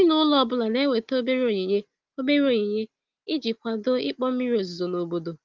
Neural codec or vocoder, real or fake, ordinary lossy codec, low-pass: none; real; Opus, 32 kbps; 7.2 kHz